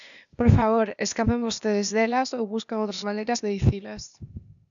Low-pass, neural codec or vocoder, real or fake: 7.2 kHz; codec, 16 kHz, 0.8 kbps, ZipCodec; fake